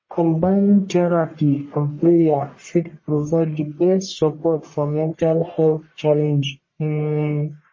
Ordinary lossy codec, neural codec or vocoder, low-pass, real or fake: MP3, 32 kbps; codec, 44.1 kHz, 1.7 kbps, Pupu-Codec; 7.2 kHz; fake